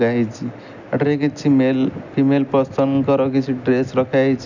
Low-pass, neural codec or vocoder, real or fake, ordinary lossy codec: 7.2 kHz; none; real; none